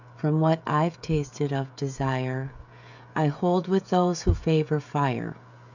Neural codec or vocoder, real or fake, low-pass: codec, 16 kHz, 16 kbps, FreqCodec, smaller model; fake; 7.2 kHz